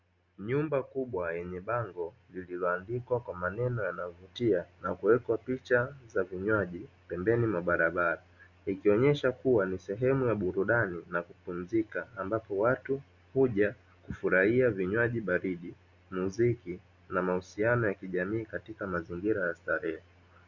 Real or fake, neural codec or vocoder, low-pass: real; none; 7.2 kHz